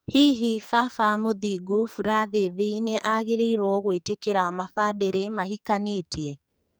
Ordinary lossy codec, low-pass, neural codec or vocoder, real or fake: none; none; codec, 44.1 kHz, 2.6 kbps, SNAC; fake